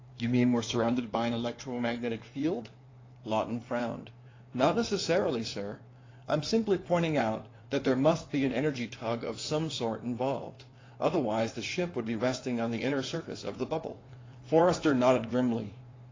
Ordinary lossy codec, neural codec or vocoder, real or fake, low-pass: AAC, 32 kbps; codec, 16 kHz in and 24 kHz out, 2.2 kbps, FireRedTTS-2 codec; fake; 7.2 kHz